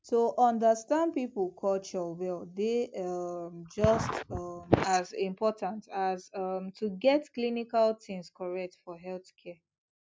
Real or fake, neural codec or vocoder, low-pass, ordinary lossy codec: real; none; none; none